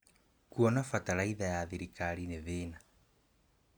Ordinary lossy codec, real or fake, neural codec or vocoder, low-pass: none; real; none; none